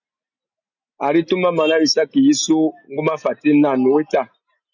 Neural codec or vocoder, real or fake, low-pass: none; real; 7.2 kHz